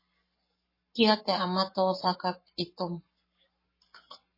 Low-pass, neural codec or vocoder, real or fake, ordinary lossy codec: 5.4 kHz; codec, 44.1 kHz, 7.8 kbps, Pupu-Codec; fake; MP3, 24 kbps